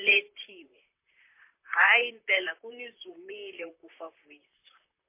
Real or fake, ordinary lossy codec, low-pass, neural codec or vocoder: fake; MP3, 24 kbps; 3.6 kHz; vocoder, 44.1 kHz, 128 mel bands, Pupu-Vocoder